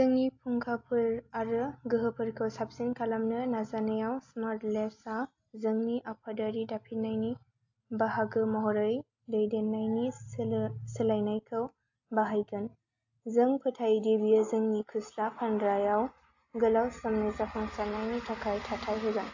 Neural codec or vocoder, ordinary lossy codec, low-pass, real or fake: none; AAC, 48 kbps; 7.2 kHz; real